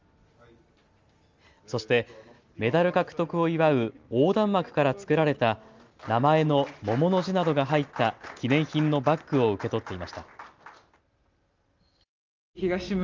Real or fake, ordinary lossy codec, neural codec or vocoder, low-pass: real; Opus, 32 kbps; none; 7.2 kHz